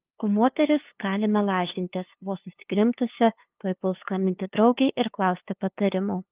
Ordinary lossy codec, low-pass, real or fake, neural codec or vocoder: Opus, 32 kbps; 3.6 kHz; fake; codec, 16 kHz, 2 kbps, FunCodec, trained on LibriTTS, 25 frames a second